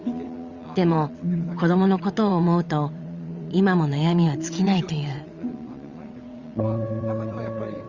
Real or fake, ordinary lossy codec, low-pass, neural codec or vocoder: fake; Opus, 64 kbps; 7.2 kHz; vocoder, 22.05 kHz, 80 mel bands, WaveNeXt